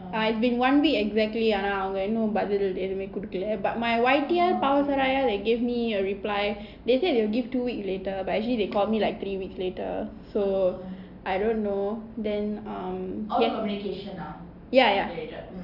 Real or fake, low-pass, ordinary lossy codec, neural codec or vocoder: real; 5.4 kHz; none; none